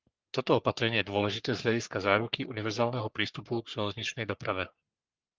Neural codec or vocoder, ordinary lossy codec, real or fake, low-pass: codec, 44.1 kHz, 3.4 kbps, Pupu-Codec; Opus, 32 kbps; fake; 7.2 kHz